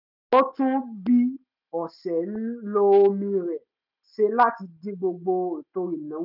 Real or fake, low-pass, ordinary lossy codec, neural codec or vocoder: real; 5.4 kHz; none; none